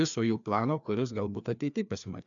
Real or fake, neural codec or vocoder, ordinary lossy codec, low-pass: fake; codec, 16 kHz, 2 kbps, FreqCodec, larger model; MP3, 96 kbps; 7.2 kHz